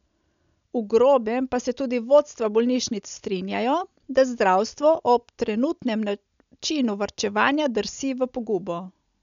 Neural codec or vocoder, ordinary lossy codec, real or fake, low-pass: none; none; real; 7.2 kHz